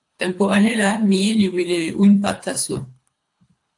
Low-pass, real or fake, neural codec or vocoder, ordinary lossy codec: 10.8 kHz; fake; codec, 24 kHz, 3 kbps, HILCodec; AAC, 64 kbps